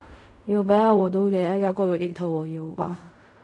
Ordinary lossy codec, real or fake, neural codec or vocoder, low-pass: MP3, 96 kbps; fake; codec, 16 kHz in and 24 kHz out, 0.4 kbps, LongCat-Audio-Codec, fine tuned four codebook decoder; 10.8 kHz